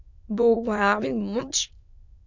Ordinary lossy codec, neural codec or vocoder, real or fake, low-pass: MP3, 64 kbps; autoencoder, 22.05 kHz, a latent of 192 numbers a frame, VITS, trained on many speakers; fake; 7.2 kHz